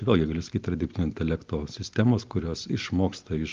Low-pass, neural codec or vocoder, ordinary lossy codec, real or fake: 7.2 kHz; none; Opus, 32 kbps; real